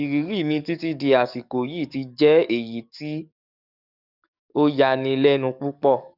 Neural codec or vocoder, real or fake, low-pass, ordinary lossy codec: codec, 44.1 kHz, 7.8 kbps, DAC; fake; 5.4 kHz; none